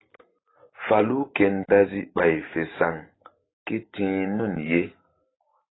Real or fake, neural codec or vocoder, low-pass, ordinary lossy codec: fake; vocoder, 44.1 kHz, 128 mel bands every 512 samples, BigVGAN v2; 7.2 kHz; AAC, 16 kbps